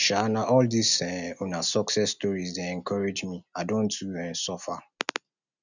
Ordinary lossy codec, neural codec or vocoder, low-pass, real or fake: none; none; 7.2 kHz; real